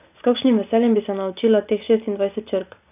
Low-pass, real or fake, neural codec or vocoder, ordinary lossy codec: 3.6 kHz; real; none; none